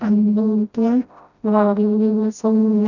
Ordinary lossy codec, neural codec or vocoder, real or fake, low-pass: none; codec, 16 kHz, 0.5 kbps, FreqCodec, smaller model; fake; 7.2 kHz